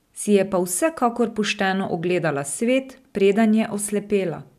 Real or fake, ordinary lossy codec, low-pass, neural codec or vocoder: real; none; 14.4 kHz; none